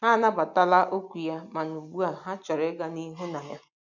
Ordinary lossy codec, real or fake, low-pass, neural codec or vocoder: none; real; 7.2 kHz; none